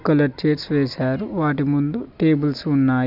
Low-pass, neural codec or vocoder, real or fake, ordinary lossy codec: 5.4 kHz; none; real; none